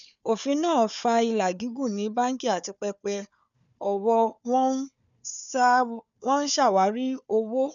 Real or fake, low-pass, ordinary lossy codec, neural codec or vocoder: fake; 7.2 kHz; none; codec, 16 kHz, 4 kbps, FunCodec, trained on Chinese and English, 50 frames a second